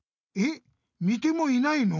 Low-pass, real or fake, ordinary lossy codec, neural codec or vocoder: 7.2 kHz; real; none; none